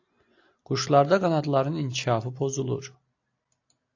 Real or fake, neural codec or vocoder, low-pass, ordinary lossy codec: real; none; 7.2 kHz; AAC, 48 kbps